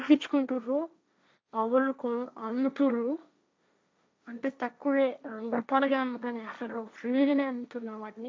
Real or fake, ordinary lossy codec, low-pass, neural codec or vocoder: fake; none; none; codec, 16 kHz, 1.1 kbps, Voila-Tokenizer